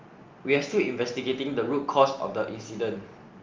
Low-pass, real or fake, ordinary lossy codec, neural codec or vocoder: 7.2 kHz; real; Opus, 32 kbps; none